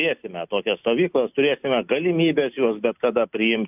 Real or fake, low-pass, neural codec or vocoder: real; 3.6 kHz; none